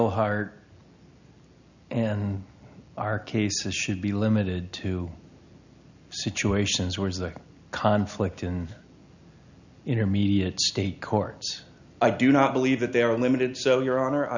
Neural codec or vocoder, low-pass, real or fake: none; 7.2 kHz; real